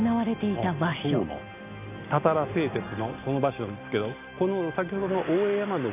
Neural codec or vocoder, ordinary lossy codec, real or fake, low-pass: none; none; real; 3.6 kHz